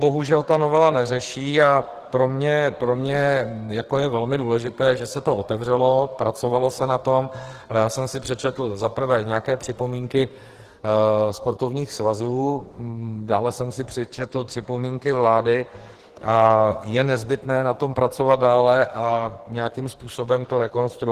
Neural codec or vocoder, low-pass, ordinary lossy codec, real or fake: codec, 44.1 kHz, 2.6 kbps, SNAC; 14.4 kHz; Opus, 16 kbps; fake